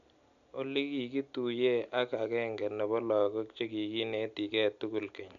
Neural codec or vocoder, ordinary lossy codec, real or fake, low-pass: none; none; real; 7.2 kHz